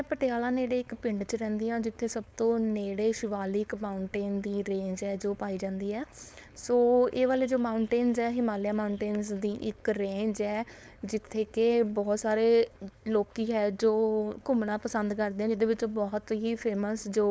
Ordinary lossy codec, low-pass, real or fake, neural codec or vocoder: none; none; fake; codec, 16 kHz, 4.8 kbps, FACodec